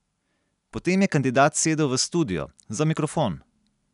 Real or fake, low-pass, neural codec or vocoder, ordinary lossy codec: real; 10.8 kHz; none; none